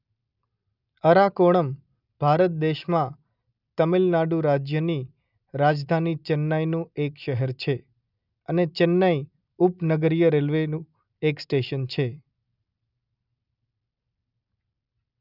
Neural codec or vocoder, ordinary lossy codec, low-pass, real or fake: none; none; 5.4 kHz; real